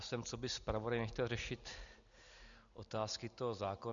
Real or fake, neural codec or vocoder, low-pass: real; none; 7.2 kHz